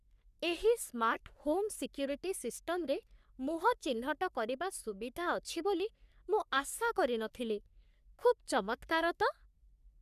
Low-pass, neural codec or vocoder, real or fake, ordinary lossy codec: 14.4 kHz; codec, 44.1 kHz, 3.4 kbps, Pupu-Codec; fake; none